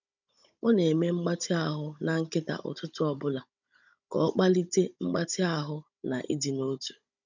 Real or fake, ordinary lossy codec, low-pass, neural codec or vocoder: fake; none; 7.2 kHz; codec, 16 kHz, 16 kbps, FunCodec, trained on Chinese and English, 50 frames a second